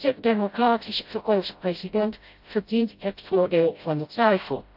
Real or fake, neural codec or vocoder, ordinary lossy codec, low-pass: fake; codec, 16 kHz, 0.5 kbps, FreqCodec, smaller model; AAC, 48 kbps; 5.4 kHz